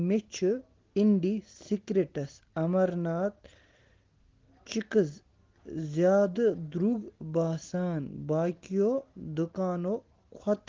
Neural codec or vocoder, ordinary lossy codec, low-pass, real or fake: none; Opus, 16 kbps; 7.2 kHz; real